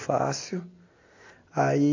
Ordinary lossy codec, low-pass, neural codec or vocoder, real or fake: MP3, 48 kbps; 7.2 kHz; none; real